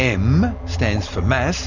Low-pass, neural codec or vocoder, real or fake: 7.2 kHz; none; real